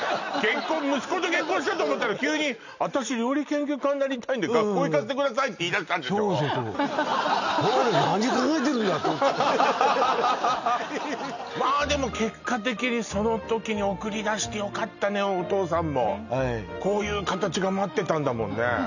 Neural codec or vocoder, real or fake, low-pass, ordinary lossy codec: none; real; 7.2 kHz; none